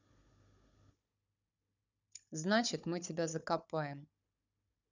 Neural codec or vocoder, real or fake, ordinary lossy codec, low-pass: codec, 16 kHz, 16 kbps, FunCodec, trained on Chinese and English, 50 frames a second; fake; none; 7.2 kHz